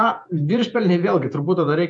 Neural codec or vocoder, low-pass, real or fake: vocoder, 48 kHz, 128 mel bands, Vocos; 9.9 kHz; fake